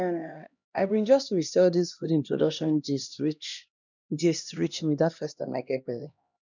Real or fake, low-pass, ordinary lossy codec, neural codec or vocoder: fake; 7.2 kHz; none; codec, 16 kHz, 1 kbps, X-Codec, HuBERT features, trained on LibriSpeech